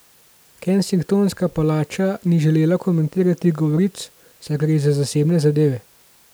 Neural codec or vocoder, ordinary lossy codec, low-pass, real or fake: none; none; none; real